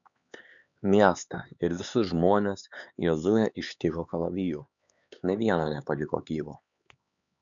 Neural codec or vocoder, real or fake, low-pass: codec, 16 kHz, 4 kbps, X-Codec, HuBERT features, trained on LibriSpeech; fake; 7.2 kHz